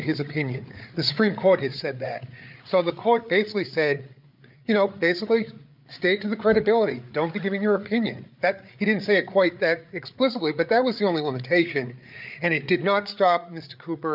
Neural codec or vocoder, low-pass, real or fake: codec, 16 kHz, 8 kbps, FreqCodec, larger model; 5.4 kHz; fake